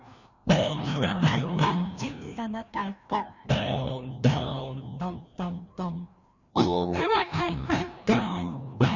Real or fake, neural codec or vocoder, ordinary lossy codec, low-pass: fake; codec, 16 kHz, 1 kbps, FreqCodec, larger model; none; 7.2 kHz